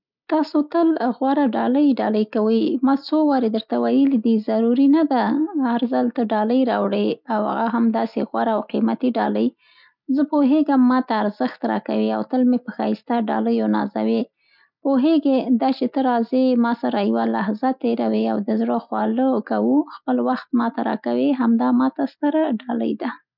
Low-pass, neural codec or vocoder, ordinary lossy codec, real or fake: 5.4 kHz; none; none; real